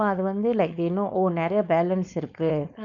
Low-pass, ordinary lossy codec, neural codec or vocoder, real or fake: 7.2 kHz; none; codec, 16 kHz, 4.8 kbps, FACodec; fake